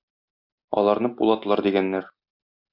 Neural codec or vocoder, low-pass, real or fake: none; 5.4 kHz; real